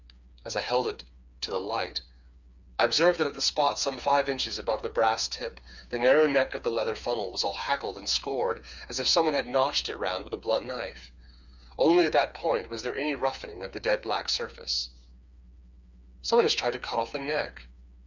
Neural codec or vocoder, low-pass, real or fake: codec, 16 kHz, 4 kbps, FreqCodec, smaller model; 7.2 kHz; fake